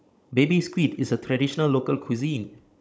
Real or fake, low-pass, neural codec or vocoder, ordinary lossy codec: fake; none; codec, 16 kHz, 16 kbps, FunCodec, trained on Chinese and English, 50 frames a second; none